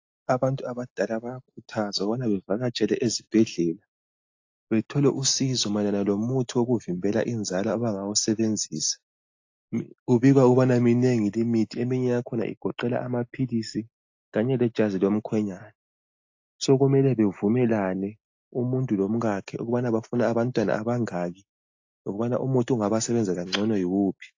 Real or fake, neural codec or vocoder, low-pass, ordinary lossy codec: real; none; 7.2 kHz; AAC, 48 kbps